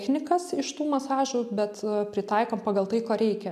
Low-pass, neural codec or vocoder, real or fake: 14.4 kHz; none; real